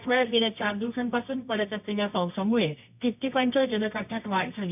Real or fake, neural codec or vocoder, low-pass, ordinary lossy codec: fake; codec, 24 kHz, 0.9 kbps, WavTokenizer, medium music audio release; 3.6 kHz; none